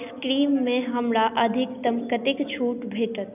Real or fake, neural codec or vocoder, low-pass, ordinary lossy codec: real; none; 3.6 kHz; none